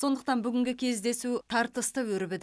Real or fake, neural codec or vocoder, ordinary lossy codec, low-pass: real; none; none; none